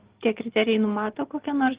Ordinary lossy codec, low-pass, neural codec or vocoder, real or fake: Opus, 16 kbps; 3.6 kHz; none; real